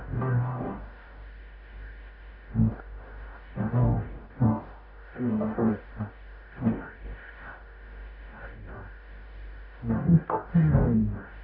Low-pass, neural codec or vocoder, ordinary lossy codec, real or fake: 5.4 kHz; codec, 44.1 kHz, 0.9 kbps, DAC; none; fake